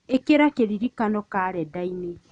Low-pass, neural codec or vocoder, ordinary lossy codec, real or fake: 9.9 kHz; none; none; real